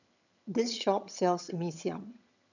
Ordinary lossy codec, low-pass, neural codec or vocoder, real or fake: none; 7.2 kHz; vocoder, 22.05 kHz, 80 mel bands, HiFi-GAN; fake